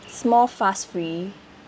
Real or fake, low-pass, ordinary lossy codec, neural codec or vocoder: real; none; none; none